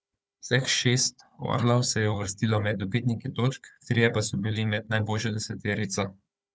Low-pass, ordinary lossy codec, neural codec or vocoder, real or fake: none; none; codec, 16 kHz, 4 kbps, FunCodec, trained on Chinese and English, 50 frames a second; fake